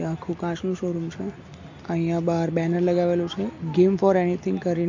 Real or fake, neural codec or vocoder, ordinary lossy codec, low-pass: real; none; MP3, 48 kbps; 7.2 kHz